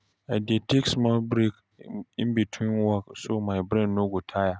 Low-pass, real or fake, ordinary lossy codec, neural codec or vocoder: none; real; none; none